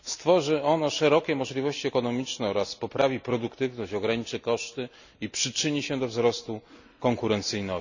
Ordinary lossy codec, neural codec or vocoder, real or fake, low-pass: none; none; real; 7.2 kHz